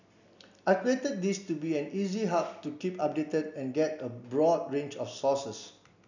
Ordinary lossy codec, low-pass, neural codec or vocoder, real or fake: none; 7.2 kHz; none; real